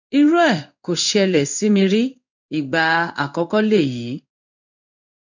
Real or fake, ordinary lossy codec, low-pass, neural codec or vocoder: fake; none; 7.2 kHz; codec, 16 kHz in and 24 kHz out, 1 kbps, XY-Tokenizer